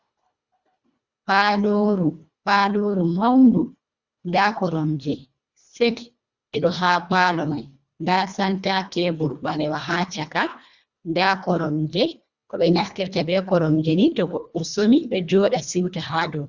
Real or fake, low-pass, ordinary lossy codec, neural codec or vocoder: fake; 7.2 kHz; Opus, 64 kbps; codec, 24 kHz, 1.5 kbps, HILCodec